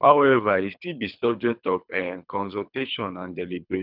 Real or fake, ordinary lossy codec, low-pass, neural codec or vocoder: fake; none; 5.4 kHz; codec, 24 kHz, 3 kbps, HILCodec